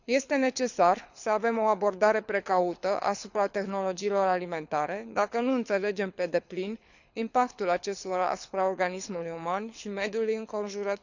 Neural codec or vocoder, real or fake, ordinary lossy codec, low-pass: codec, 24 kHz, 6 kbps, HILCodec; fake; none; 7.2 kHz